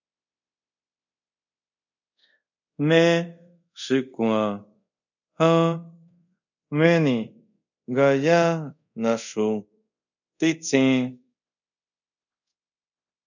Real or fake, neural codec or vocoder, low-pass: fake; codec, 24 kHz, 0.5 kbps, DualCodec; 7.2 kHz